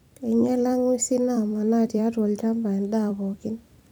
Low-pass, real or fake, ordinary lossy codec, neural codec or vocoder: none; fake; none; vocoder, 44.1 kHz, 128 mel bands every 256 samples, BigVGAN v2